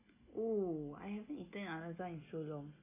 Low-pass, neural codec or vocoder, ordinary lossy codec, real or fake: 3.6 kHz; none; none; real